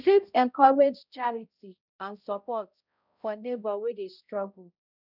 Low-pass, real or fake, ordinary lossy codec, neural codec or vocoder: 5.4 kHz; fake; none; codec, 16 kHz, 0.5 kbps, X-Codec, HuBERT features, trained on balanced general audio